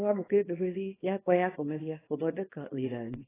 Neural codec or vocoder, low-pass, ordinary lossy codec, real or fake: codec, 24 kHz, 0.9 kbps, WavTokenizer, small release; 3.6 kHz; AAC, 16 kbps; fake